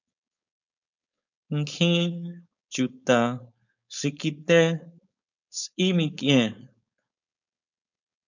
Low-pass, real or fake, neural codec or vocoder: 7.2 kHz; fake; codec, 16 kHz, 4.8 kbps, FACodec